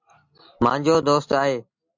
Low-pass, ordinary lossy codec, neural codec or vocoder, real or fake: 7.2 kHz; MP3, 48 kbps; none; real